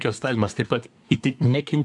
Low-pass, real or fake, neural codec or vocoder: 10.8 kHz; fake; codec, 24 kHz, 1 kbps, SNAC